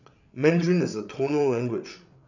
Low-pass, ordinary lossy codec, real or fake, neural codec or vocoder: 7.2 kHz; none; fake; codec, 16 kHz, 8 kbps, FreqCodec, larger model